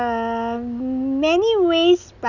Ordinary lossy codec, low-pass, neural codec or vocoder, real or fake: none; 7.2 kHz; none; real